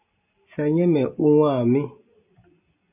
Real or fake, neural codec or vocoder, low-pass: real; none; 3.6 kHz